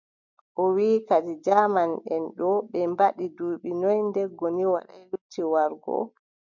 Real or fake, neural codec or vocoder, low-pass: real; none; 7.2 kHz